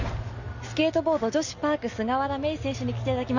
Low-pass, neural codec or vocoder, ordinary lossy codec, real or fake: 7.2 kHz; none; none; real